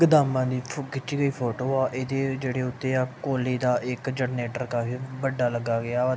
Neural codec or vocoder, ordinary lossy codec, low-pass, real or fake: none; none; none; real